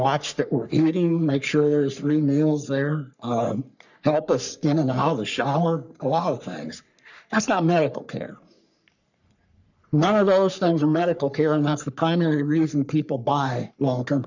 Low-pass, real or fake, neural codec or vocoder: 7.2 kHz; fake; codec, 44.1 kHz, 3.4 kbps, Pupu-Codec